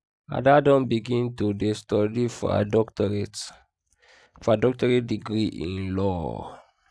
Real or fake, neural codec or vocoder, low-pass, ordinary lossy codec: real; none; none; none